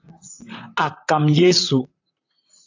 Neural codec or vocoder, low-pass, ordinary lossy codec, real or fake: vocoder, 44.1 kHz, 128 mel bands, Pupu-Vocoder; 7.2 kHz; AAC, 48 kbps; fake